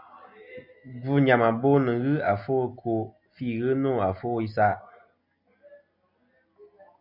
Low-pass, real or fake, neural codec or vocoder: 5.4 kHz; real; none